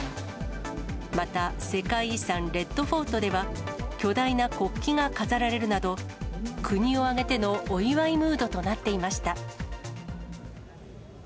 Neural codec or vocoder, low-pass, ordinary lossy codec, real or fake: none; none; none; real